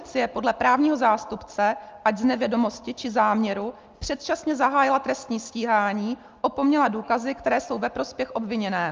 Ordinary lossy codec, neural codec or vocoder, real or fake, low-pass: Opus, 24 kbps; none; real; 7.2 kHz